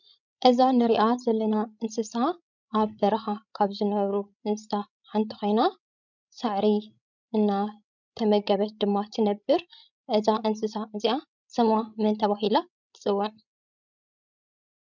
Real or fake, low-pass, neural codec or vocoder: fake; 7.2 kHz; codec, 16 kHz, 16 kbps, FreqCodec, larger model